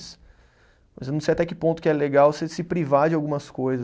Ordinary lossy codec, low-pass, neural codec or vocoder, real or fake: none; none; none; real